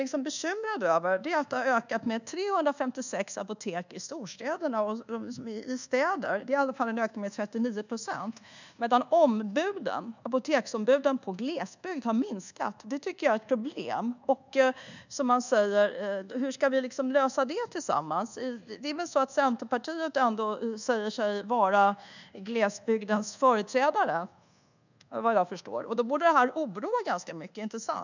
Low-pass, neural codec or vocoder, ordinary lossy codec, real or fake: 7.2 kHz; codec, 24 kHz, 1.2 kbps, DualCodec; none; fake